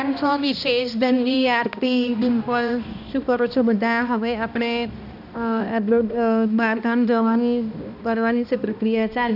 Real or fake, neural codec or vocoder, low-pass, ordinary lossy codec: fake; codec, 16 kHz, 1 kbps, X-Codec, HuBERT features, trained on balanced general audio; 5.4 kHz; none